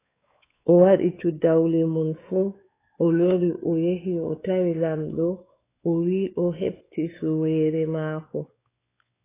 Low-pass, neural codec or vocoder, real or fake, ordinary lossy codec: 3.6 kHz; codec, 16 kHz, 4 kbps, X-Codec, WavLM features, trained on Multilingual LibriSpeech; fake; AAC, 16 kbps